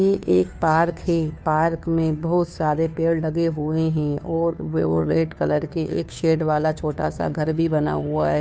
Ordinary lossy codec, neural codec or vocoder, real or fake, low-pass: none; codec, 16 kHz, 2 kbps, FunCodec, trained on Chinese and English, 25 frames a second; fake; none